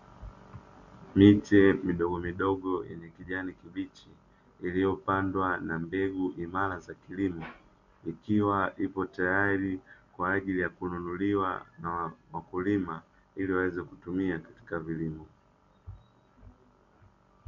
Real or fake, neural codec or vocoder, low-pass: real; none; 7.2 kHz